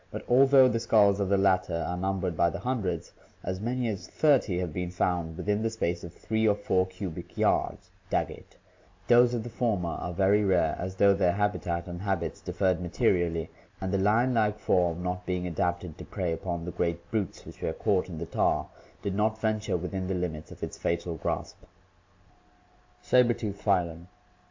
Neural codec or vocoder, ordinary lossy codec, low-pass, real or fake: none; MP3, 64 kbps; 7.2 kHz; real